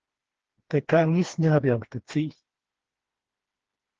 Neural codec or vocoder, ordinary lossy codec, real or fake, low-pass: codec, 16 kHz, 2 kbps, FreqCodec, smaller model; Opus, 16 kbps; fake; 7.2 kHz